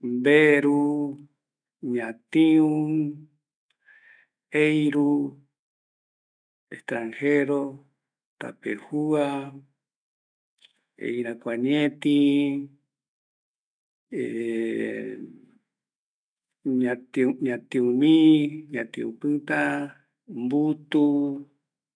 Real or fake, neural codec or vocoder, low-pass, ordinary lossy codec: fake; autoencoder, 48 kHz, 128 numbers a frame, DAC-VAE, trained on Japanese speech; 9.9 kHz; none